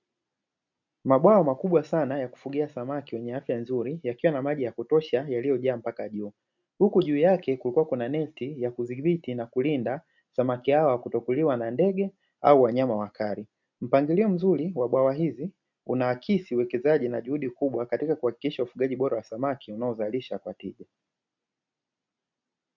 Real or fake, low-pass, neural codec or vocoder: real; 7.2 kHz; none